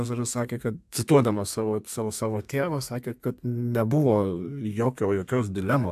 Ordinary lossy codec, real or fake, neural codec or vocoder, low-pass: AAC, 96 kbps; fake; codec, 32 kHz, 1.9 kbps, SNAC; 14.4 kHz